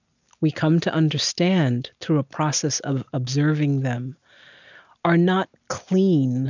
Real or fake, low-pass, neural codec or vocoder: real; 7.2 kHz; none